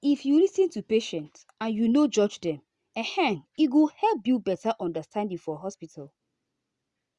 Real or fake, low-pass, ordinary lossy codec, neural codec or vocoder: real; 10.8 kHz; Opus, 64 kbps; none